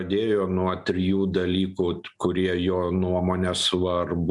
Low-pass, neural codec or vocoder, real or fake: 10.8 kHz; none; real